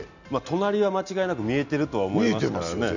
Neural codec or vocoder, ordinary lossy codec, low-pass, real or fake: none; none; 7.2 kHz; real